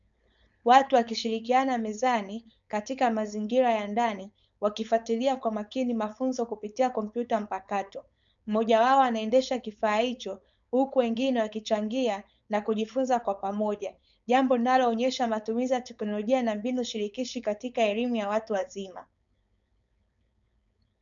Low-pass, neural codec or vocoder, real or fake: 7.2 kHz; codec, 16 kHz, 4.8 kbps, FACodec; fake